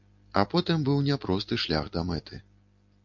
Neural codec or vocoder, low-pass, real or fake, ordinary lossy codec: none; 7.2 kHz; real; MP3, 64 kbps